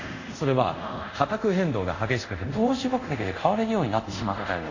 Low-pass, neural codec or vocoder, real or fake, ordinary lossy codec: 7.2 kHz; codec, 24 kHz, 0.5 kbps, DualCodec; fake; AAC, 48 kbps